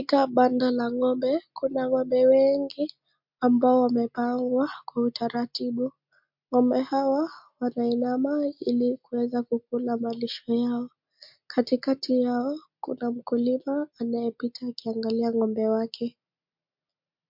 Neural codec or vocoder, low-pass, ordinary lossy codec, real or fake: none; 5.4 kHz; MP3, 48 kbps; real